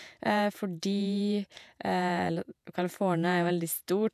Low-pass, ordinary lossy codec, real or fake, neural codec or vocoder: 14.4 kHz; none; fake; vocoder, 48 kHz, 128 mel bands, Vocos